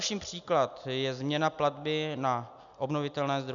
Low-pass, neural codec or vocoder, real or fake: 7.2 kHz; none; real